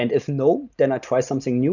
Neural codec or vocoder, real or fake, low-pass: none; real; 7.2 kHz